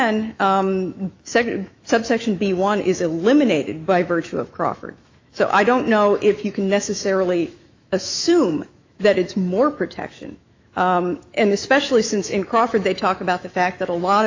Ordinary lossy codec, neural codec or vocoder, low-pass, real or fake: AAC, 48 kbps; autoencoder, 48 kHz, 128 numbers a frame, DAC-VAE, trained on Japanese speech; 7.2 kHz; fake